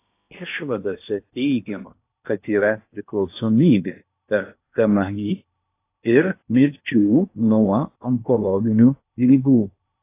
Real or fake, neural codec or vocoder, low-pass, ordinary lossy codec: fake; codec, 16 kHz in and 24 kHz out, 0.8 kbps, FocalCodec, streaming, 65536 codes; 3.6 kHz; AAC, 24 kbps